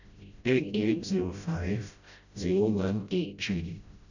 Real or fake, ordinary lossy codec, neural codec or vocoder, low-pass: fake; none; codec, 16 kHz, 0.5 kbps, FreqCodec, smaller model; 7.2 kHz